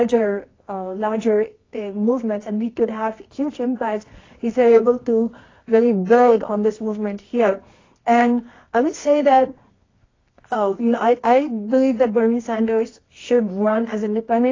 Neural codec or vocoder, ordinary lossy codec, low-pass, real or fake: codec, 24 kHz, 0.9 kbps, WavTokenizer, medium music audio release; AAC, 32 kbps; 7.2 kHz; fake